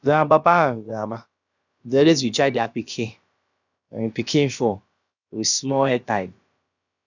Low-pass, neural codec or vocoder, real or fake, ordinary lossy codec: 7.2 kHz; codec, 16 kHz, about 1 kbps, DyCAST, with the encoder's durations; fake; none